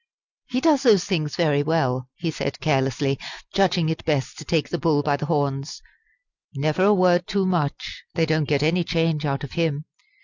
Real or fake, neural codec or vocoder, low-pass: real; none; 7.2 kHz